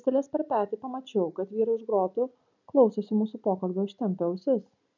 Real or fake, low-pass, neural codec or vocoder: real; 7.2 kHz; none